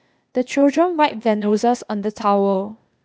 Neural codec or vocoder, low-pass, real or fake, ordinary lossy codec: codec, 16 kHz, 0.8 kbps, ZipCodec; none; fake; none